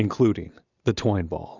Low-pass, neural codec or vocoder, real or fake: 7.2 kHz; none; real